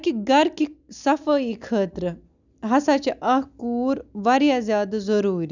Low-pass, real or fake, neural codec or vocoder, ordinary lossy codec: 7.2 kHz; real; none; none